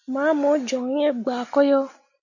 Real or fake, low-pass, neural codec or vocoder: real; 7.2 kHz; none